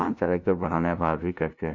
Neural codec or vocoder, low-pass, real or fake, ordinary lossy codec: codec, 16 kHz, 0.5 kbps, FunCodec, trained on LibriTTS, 25 frames a second; 7.2 kHz; fake; none